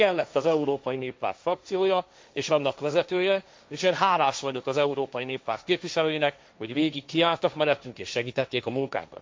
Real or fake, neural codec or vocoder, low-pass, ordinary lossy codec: fake; codec, 16 kHz, 1.1 kbps, Voila-Tokenizer; none; none